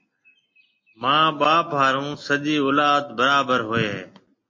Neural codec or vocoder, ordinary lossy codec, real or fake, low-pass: none; MP3, 32 kbps; real; 7.2 kHz